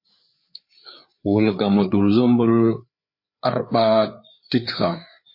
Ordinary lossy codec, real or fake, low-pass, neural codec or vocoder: MP3, 32 kbps; fake; 5.4 kHz; codec, 16 kHz, 4 kbps, FreqCodec, larger model